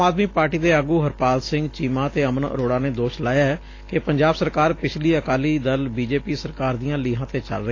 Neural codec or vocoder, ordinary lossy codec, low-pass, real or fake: none; AAC, 32 kbps; 7.2 kHz; real